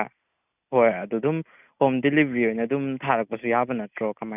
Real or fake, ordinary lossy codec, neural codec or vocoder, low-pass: real; none; none; 3.6 kHz